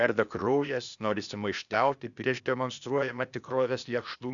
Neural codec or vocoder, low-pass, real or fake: codec, 16 kHz, 0.8 kbps, ZipCodec; 7.2 kHz; fake